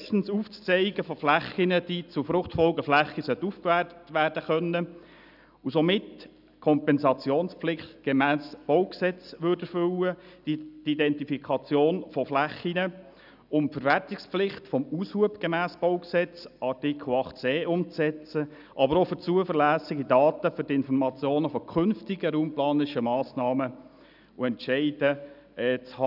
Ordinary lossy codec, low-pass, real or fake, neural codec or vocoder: none; 5.4 kHz; real; none